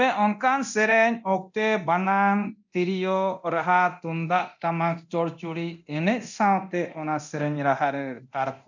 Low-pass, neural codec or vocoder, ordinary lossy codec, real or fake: 7.2 kHz; codec, 24 kHz, 0.9 kbps, DualCodec; none; fake